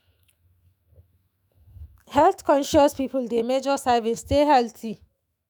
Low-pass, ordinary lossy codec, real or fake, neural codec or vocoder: none; none; fake; autoencoder, 48 kHz, 128 numbers a frame, DAC-VAE, trained on Japanese speech